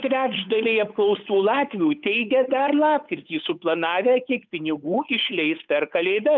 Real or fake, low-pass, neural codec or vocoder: fake; 7.2 kHz; codec, 16 kHz, 8 kbps, FunCodec, trained on Chinese and English, 25 frames a second